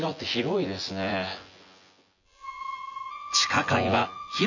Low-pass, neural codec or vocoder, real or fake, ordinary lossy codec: 7.2 kHz; vocoder, 24 kHz, 100 mel bands, Vocos; fake; none